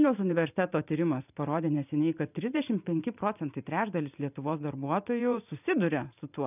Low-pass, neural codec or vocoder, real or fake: 3.6 kHz; vocoder, 44.1 kHz, 128 mel bands every 512 samples, BigVGAN v2; fake